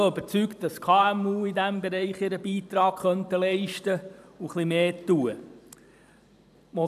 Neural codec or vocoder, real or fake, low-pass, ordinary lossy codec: vocoder, 44.1 kHz, 128 mel bands every 512 samples, BigVGAN v2; fake; 14.4 kHz; none